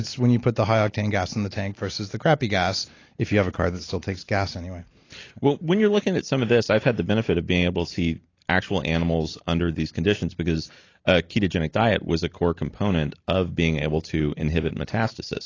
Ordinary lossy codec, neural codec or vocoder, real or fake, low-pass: AAC, 32 kbps; none; real; 7.2 kHz